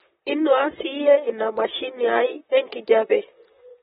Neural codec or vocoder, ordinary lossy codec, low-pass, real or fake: vocoder, 44.1 kHz, 128 mel bands, Pupu-Vocoder; AAC, 16 kbps; 19.8 kHz; fake